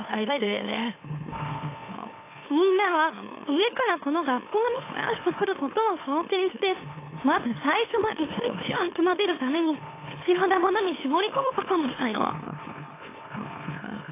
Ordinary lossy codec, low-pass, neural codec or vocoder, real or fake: AAC, 24 kbps; 3.6 kHz; autoencoder, 44.1 kHz, a latent of 192 numbers a frame, MeloTTS; fake